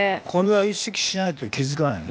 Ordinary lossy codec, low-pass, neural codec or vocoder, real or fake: none; none; codec, 16 kHz, 0.8 kbps, ZipCodec; fake